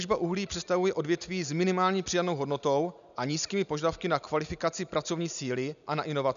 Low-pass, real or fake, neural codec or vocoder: 7.2 kHz; real; none